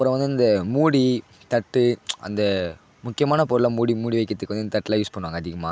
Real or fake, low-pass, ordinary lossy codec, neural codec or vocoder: real; none; none; none